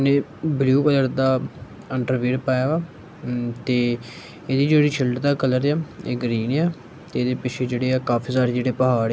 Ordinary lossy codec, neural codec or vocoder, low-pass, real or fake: none; none; none; real